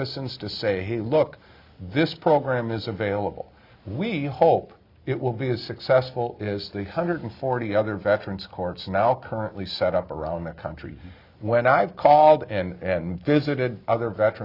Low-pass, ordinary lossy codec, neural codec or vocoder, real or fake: 5.4 kHz; Opus, 64 kbps; none; real